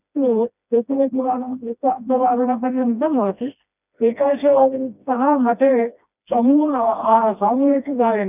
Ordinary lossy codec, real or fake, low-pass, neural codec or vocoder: none; fake; 3.6 kHz; codec, 16 kHz, 1 kbps, FreqCodec, smaller model